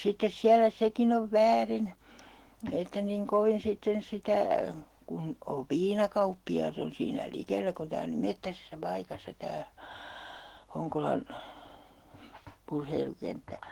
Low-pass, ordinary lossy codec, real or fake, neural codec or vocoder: 19.8 kHz; Opus, 16 kbps; fake; autoencoder, 48 kHz, 128 numbers a frame, DAC-VAE, trained on Japanese speech